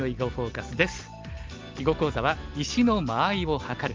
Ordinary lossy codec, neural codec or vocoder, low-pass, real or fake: Opus, 32 kbps; none; 7.2 kHz; real